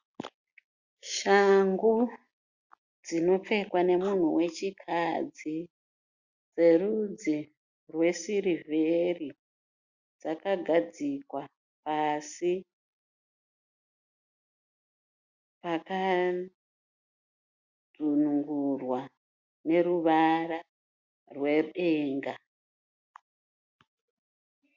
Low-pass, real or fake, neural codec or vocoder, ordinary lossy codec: 7.2 kHz; real; none; Opus, 64 kbps